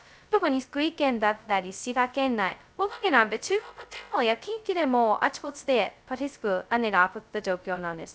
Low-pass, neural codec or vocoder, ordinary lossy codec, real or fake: none; codec, 16 kHz, 0.2 kbps, FocalCodec; none; fake